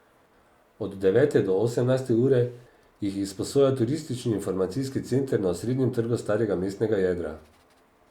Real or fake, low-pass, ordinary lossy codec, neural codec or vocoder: real; 19.8 kHz; Opus, 64 kbps; none